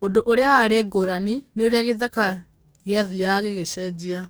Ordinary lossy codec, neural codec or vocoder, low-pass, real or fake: none; codec, 44.1 kHz, 2.6 kbps, DAC; none; fake